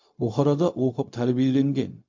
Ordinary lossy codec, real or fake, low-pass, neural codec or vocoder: MP3, 48 kbps; fake; 7.2 kHz; codec, 16 kHz, 0.4 kbps, LongCat-Audio-Codec